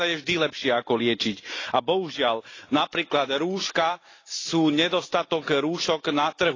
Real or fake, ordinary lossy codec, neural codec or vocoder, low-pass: real; AAC, 32 kbps; none; 7.2 kHz